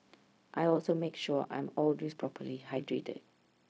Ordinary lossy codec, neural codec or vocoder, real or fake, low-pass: none; codec, 16 kHz, 0.4 kbps, LongCat-Audio-Codec; fake; none